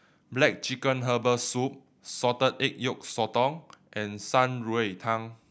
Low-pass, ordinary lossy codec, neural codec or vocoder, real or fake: none; none; none; real